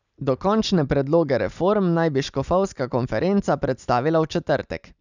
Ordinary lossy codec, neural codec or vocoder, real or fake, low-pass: none; none; real; 7.2 kHz